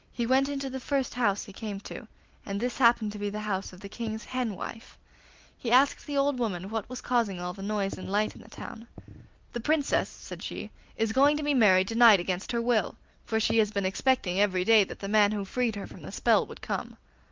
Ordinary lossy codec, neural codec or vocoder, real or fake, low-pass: Opus, 32 kbps; none; real; 7.2 kHz